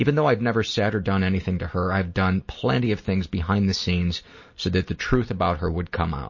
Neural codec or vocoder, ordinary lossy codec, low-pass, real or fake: none; MP3, 32 kbps; 7.2 kHz; real